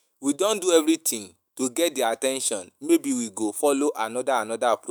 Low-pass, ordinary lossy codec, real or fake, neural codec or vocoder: none; none; fake; autoencoder, 48 kHz, 128 numbers a frame, DAC-VAE, trained on Japanese speech